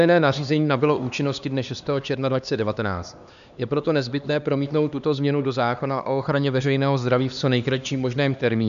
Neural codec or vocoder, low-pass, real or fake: codec, 16 kHz, 2 kbps, X-Codec, HuBERT features, trained on LibriSpeech; 7.2 kHz; fake